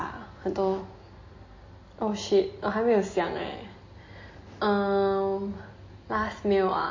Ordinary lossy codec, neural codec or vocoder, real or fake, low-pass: MP3, 32 kbps; none; real; 7.2 kHz